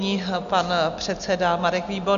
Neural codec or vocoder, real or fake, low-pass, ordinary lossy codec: none; real; 7.2 kHz; AAC, 96 kbps